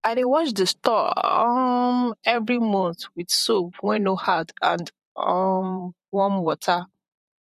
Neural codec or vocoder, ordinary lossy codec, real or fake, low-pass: vocoder, 44.1 kHz, 128 mel bands, Pupu-Vocoder; MP3, 64 kbps; fake; 14.4 kHz